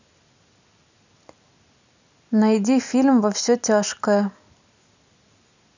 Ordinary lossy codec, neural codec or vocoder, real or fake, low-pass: none; none; real; 7.2 kHz